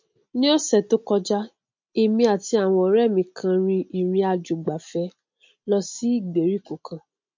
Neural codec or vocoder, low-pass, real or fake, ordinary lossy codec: none; 7.2 kHz; real; MP3, 48 kbps